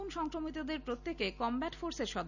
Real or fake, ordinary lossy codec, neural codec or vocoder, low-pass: real; none; none; 7.2 kHz